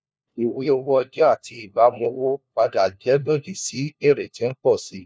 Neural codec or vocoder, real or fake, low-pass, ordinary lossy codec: codec, 16 kHz, 1 kbps, FunCodec, trained on LibriTTS, 50 frames a second; fake; none; none